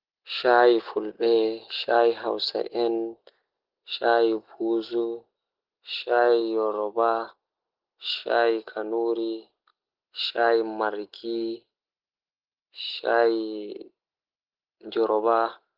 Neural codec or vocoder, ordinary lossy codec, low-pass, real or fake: none; Opus, 16 kbps; 5.4 kHz; real